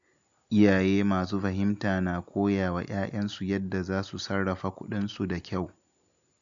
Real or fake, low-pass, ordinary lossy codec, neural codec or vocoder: real; 7.2 kHz; none; none